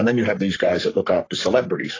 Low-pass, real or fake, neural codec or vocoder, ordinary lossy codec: 7.2 kHz; fake; codec, 44.1 kHz, 3.4 kbps, Pupu-Codec; AAC, 32 kbps